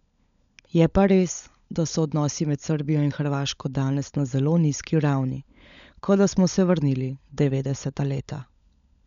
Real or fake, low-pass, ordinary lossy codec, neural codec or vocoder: fake; 7.2 kHz; none; codec, 16 kHz, 16 kbps, FunCodec, trained on LibriTTS, 50 frames a second